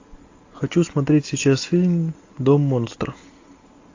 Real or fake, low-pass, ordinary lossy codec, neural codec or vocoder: real; 7.2 kHz; AAC, 48 kbps; none